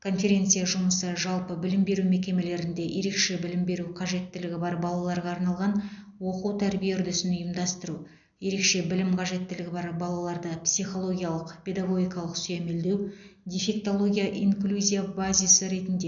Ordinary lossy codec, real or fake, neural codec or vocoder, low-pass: none; real; none; 7.2 kHz